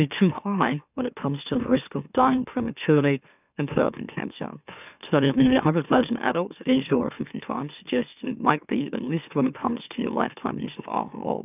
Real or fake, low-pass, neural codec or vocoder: fake; 3.6 kHz; autoencoder, 44.1 kHz, a latent of 192 numbers a frame, MeloTTS